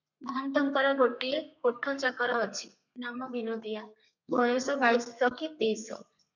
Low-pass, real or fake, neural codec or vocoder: 7.2 kHz; fake; codec, 32 kHz, 1.9 kbps, SNAC